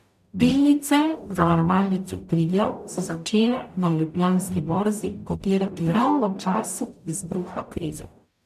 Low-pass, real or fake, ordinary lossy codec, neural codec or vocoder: 14.4 kHz; fake; none; codec, 44.1 kHz, 0.9 kbps, DAC